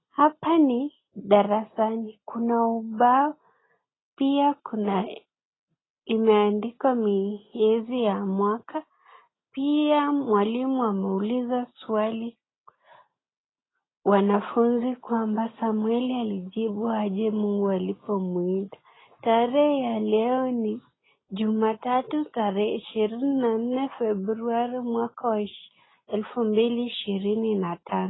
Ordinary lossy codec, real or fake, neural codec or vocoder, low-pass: AAC, 16 kbps; real; none; 7.2 kHz